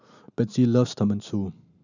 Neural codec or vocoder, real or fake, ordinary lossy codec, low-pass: none; real; none; 7.2 kHz